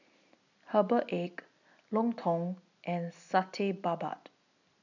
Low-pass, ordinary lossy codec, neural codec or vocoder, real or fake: 7.2 kHz; none; none; real